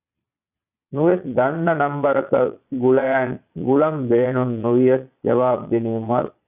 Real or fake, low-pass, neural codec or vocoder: fake; 3.6 kHz; vocoder, 22.05 kHz, 80 mel bands, Vocos